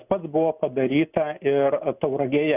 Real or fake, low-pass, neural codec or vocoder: real; 3.6 kHz; none